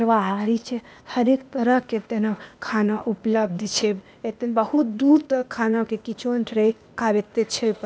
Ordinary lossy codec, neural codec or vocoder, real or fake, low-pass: none; codec, 16 kHz, 0.8 kbps, ZipCodec; fake; none